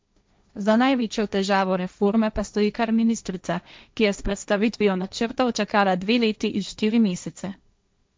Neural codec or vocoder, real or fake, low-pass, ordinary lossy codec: codec, 16 kHz, 1.1 kbps, Voila-Tokenizer; fake; none; none